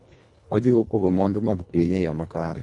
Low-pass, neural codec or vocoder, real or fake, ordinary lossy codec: 10.8 kHz; codec, 24 kHz, 1.5 kbps, HILCodec; fake; none